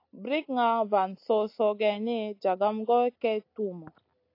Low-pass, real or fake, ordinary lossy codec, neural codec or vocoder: 5.4 kHz; real; AAC, 32 kbps; none